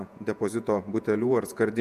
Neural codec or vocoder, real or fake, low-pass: vocoder, 48 kHz, 128 mel bands, Vocos; fake; 14.4 kHz